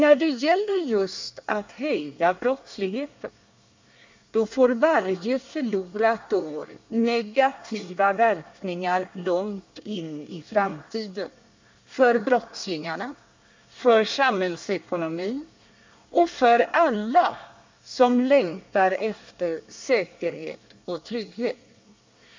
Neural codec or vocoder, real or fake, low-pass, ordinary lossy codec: codec, 24 kHz, 1 kbps, SNAC; fake; 7.2 kHz; MP3, 64 kbps